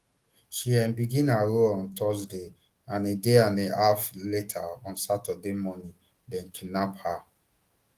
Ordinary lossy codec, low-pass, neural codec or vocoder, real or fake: Opus, 24 kbps; 14.4 kHz; autoencoder, 48 kHz, 128 numbers a frame, DAC-VAE, trained on Japanese speech; fake